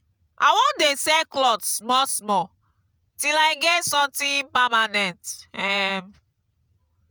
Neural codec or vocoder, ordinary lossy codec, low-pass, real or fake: vocoder, 48 kHz, 128 mel bands, Vocos; none; none; fake